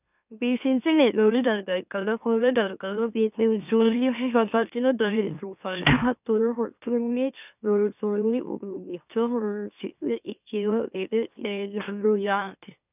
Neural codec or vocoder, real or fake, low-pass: autoencoder, 44.1 kHz, a latent of 192 numbers a frame, MeloTTS; fake; 3.6 kHz